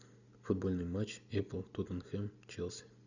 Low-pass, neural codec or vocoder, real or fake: 7.2 kHz; none; real